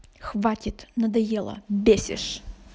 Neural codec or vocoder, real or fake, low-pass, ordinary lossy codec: none; real; none; none